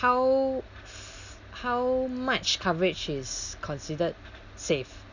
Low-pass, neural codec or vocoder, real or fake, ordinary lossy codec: 7.2 kHz; none; real; none